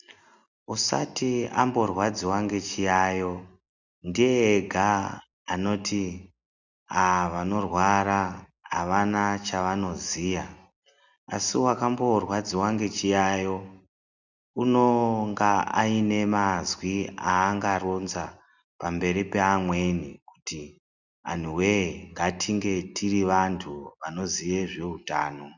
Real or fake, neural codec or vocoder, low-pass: real; none; 7.2 kHz